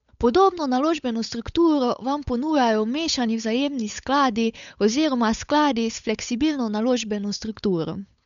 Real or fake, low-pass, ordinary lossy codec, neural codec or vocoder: fake; 7.2 kHz; none; codec, 16 kHz, 8 kbps, FunCodec, trained on Chinese and English, 25 frames a second